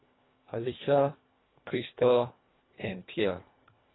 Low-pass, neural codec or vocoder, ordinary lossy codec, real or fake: 7.2 kHz; codec, 24 kHz, 1.5 kbps, HILCodec; AAC, 16 kbps; fake